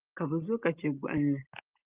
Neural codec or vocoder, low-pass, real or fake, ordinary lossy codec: none; 3.6 kHz; real; Opus, 32 kbps